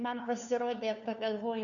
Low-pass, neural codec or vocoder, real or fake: 7.2 kHz; codec, 16 kHz, 2 kbps, FunCodec, trained on LibriTTS, 25 frames a second; fake